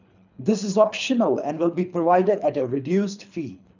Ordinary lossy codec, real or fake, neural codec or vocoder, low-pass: none; fake; codec, 24 kHz, 3 kbps, HILCodec; 7.2 kHz